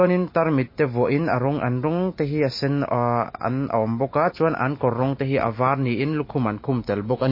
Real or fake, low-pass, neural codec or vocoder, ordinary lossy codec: real; 5.4 kHz; none; MP3, 24 kbps